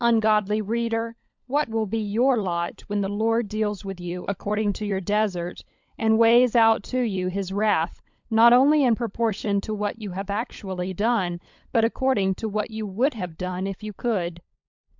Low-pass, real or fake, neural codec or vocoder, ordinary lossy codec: 7.2 kHz; fake; codec, 16 kHz, 16 kbps, FunCodec, trained on LibriTTS, 50 frames a second; MP3, 64 kbps